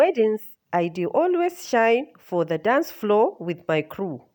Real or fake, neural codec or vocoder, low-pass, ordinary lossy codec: real; none; 19.8 kHz; none